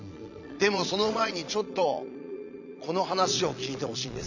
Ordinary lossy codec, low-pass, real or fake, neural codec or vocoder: none; 7.2 kHz; fake; vocoder, 22.05 kHz, 80 mel bands, Vocos